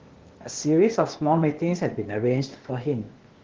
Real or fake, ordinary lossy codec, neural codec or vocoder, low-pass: fake; Opus, 16 kbps; codec, 16 kHz, 0.8 kbps, ZipCodec; 7.2 kHz